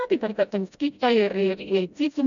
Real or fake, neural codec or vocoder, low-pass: fake; codec, 16 kHz, 0.5 kbps, FreqCodec, smaller model; 7.2 kHz